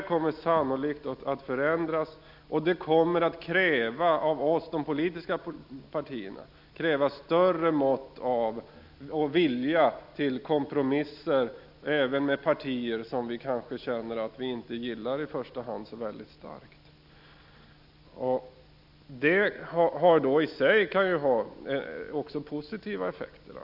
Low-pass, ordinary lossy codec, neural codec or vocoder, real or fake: 5.4 kHz; none; none; real